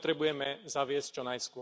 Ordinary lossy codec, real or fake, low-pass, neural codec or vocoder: none; real; none; none